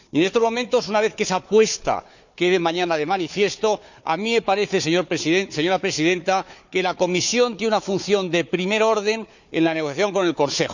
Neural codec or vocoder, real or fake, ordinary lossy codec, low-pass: codec, 16 kHz, 4 kbps, FunCodec, trained on Chinese and English, 50 frames a second; fake; none; 7.2 kHz